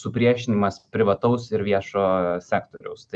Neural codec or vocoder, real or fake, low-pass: none; real; 9.9 kHz